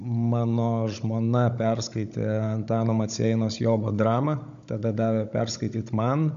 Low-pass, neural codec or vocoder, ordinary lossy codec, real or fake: 7.2 kHz; codec, 16 kHz, 16 kbps, FunCodec, trained on Chinese and English, 50 frames a second; MP3, 64 kbps; fake